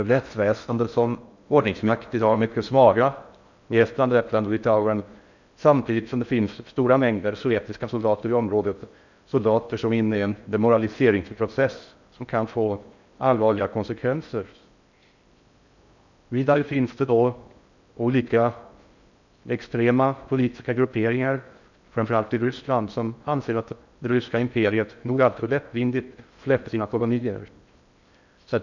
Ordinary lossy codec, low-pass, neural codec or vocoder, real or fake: none; 7.2 kHz; codec, 16 kHz in and 24 kHz out, 0.6 kbps, FocalCodec, streaming, 4096 codes; fake